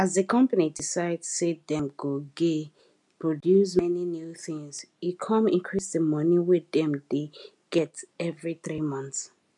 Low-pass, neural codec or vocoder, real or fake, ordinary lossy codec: 10.8 kHz; none; real; none